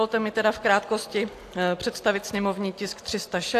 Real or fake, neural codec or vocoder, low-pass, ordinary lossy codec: real; none; 14.4 kHz; AAC, 64 kbps